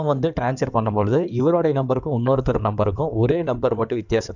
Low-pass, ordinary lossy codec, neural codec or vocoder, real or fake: 7.2 kHz; none; codec, 16 kHz in and 24 kHz out, 2.2 kbps, FireRedTTS-2 codec; fake